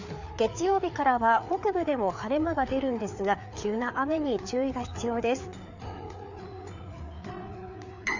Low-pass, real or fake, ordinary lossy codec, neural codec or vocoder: 7.2 kHz; fake; none; codec, 16 kHz, 4 kbps, FreqCodec, larger model